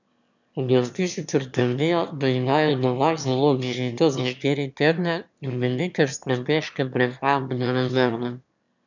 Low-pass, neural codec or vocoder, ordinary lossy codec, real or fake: 7.2 kHz; autoencoder, 22.05 kHz, a latent of 192 numbers a frame, VITS, trained on one speaker; none; fake